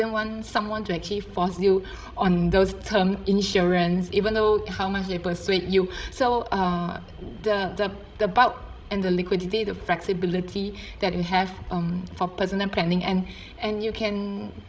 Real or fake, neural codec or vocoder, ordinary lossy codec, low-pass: fake; codec, 16 kHz, 16 kbps, FreqCodec, larger model; none; none